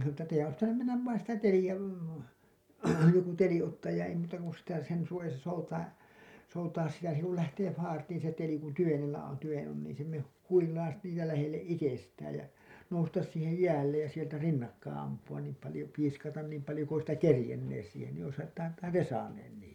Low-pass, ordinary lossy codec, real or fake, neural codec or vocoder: 19.8 kHz; none; real; none